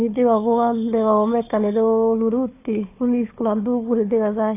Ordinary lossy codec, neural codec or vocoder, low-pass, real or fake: AAC, 24 kbps; codec, 16 kHz, 4 kbps, FunCodec, trained on Chinese and English, 50 frames a second; 3.6 kHz; fake